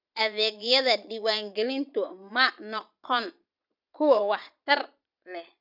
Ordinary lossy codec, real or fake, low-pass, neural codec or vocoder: none; fake; 5.4 kHz; vocoder, 22.05 kHz, 80 mel bands, Vocos